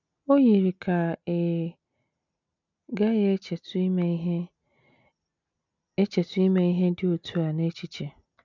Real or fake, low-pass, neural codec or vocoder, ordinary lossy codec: real; 7.2 kHz; none; none